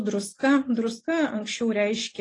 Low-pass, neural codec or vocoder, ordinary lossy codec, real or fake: 10.8 kHz; none; AAC, 32 kbps; real